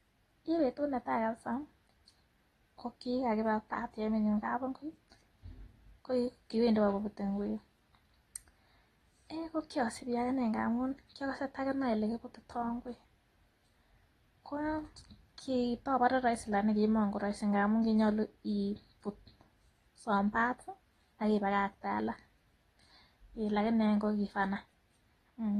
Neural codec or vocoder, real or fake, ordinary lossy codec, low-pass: none; real; AAC, 32 kbps; 19.8 kHz